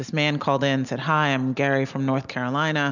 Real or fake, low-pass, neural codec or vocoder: real; 7.2 kHz; none